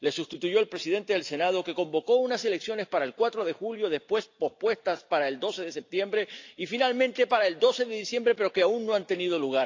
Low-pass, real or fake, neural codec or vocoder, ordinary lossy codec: 7.2 kHz; real; none; AAC, 48 kbps